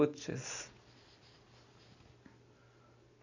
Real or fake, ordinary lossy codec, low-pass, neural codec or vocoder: real; AAC, 48 kbps; 7.2 kHz; none